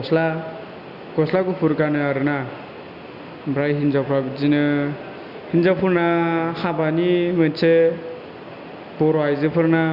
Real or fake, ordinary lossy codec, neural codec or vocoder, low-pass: real; Opus, 64 kbps; none; 5.4 kHz